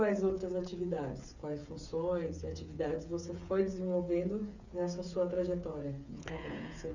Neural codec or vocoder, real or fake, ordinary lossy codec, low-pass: codec, 16 kHz, 8 kbps, FreqCodec, smaller model; fake; none; 7.2 kHz